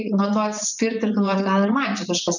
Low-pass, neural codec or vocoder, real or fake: 7.2 kHz; vocoder, 44.1 kHz, 128 mel bands, Pupu-Vocoder; fake